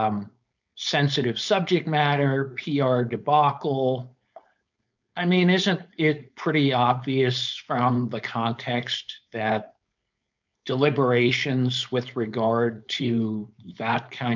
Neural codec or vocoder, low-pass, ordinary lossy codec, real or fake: codec, 16 kHz, 4.8 kbps, FACodec; 7.2 kHz; MP3, 64 kbps; fake